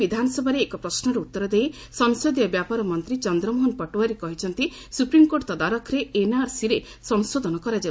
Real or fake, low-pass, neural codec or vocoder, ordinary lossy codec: real; none; none; none